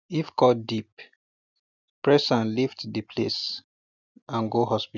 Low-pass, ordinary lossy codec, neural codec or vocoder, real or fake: 7.2 kHz; none; none; real